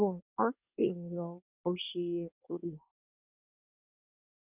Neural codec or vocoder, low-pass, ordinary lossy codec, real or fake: codec, 24 kHz, 1 kbps, SNAC; 3.6 kHz; none; fake